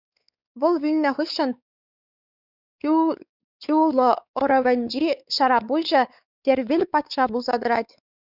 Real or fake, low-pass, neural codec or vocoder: fake; 5.4 kHz; codec, 16 kHz, 4 kbps, X-Codec, WavLM features, trained on Multilingual LibriSpeech